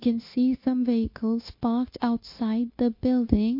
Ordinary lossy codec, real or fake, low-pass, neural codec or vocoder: MP3, 32 kbps; fake; 5.4 kHz; codec, 16 kHz, 0.9 kbps, LongCat-Audio-Codec